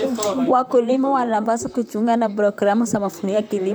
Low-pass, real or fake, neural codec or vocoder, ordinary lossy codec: none; fake; vocoder, 44.1 kHz, 128 mel bands, Pupu-Vocoder; none